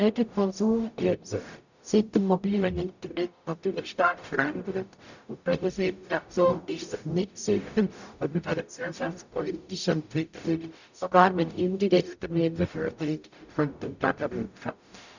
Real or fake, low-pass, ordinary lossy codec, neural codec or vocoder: fake; 7.2 kHz; none; codec, 44.1 kHz, 0.9 kbps, DAC